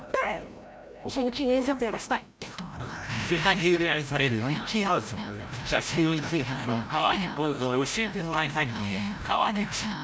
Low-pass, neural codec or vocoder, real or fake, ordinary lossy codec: none; codec, 16 kHz, 0.5 kbps, FreqCodec, larger model; fake; none